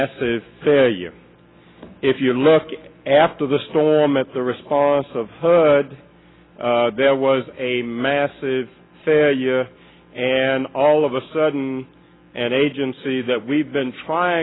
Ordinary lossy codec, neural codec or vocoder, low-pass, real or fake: AAC, 16 kbps; none; 7.2 kHz; real